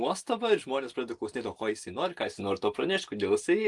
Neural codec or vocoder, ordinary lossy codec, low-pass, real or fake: none; Opus, 24 kbps; 10.8 kHz; real